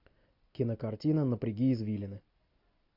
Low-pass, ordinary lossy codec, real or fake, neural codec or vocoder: 5.4 kHz; AAC, 32 kbps; real; none